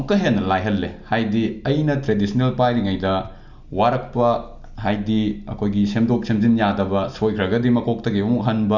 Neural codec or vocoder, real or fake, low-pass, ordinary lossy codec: none; real; 7.2 kHz; none